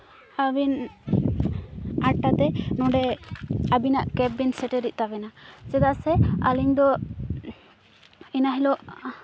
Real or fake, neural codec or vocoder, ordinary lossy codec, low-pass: real; none; none; none